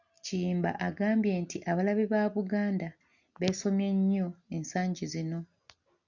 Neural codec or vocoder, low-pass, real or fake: none; 7.2 kHz; real